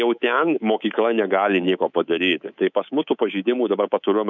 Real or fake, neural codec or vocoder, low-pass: fake; codec, 24 kHz, 3.1 kbps, DualCodec; 7.2 kHz